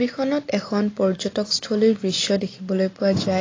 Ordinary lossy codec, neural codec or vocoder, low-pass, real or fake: AAC, 32 kbps; none; 7.2 kHz; real